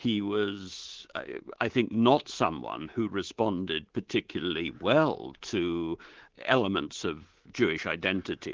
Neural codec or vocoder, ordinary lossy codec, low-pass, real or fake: codec, 24 kHz, 3.1 kbps, DualCodec; Opus, 16 kbps; 7.2 kHz; fake